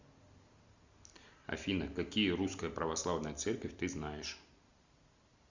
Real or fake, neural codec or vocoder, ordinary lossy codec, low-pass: real; none; Opus, 64 kbps; 7.2 kHz